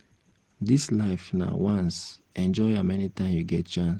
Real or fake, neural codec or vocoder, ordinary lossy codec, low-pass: real; none; Opus, 16 kbps; 19.8 kHz